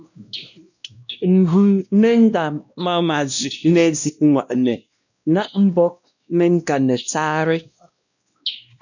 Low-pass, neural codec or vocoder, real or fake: 7.2 kHz; codec, 16 kHz, 1 kbps, X-Codec, WavLM features, trained on Multilingual LibriSpeech; fake